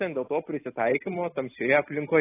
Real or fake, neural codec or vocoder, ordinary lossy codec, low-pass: real; none; AAC, 16 kbps; 3.6 kHz